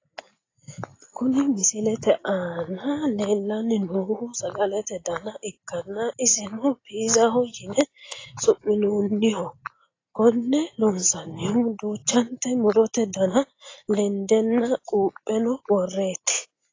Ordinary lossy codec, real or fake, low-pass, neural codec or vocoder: AAC, 32 kbps; real; 7.2 kHz; none